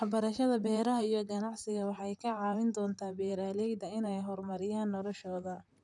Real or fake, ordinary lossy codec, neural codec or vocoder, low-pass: fake; none; vocoder, 44.1 kHz, 128 mel bands, Pupu-Vocoder; 10.8 kHz